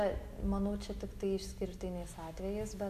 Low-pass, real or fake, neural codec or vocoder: 14.4 kHz; real; none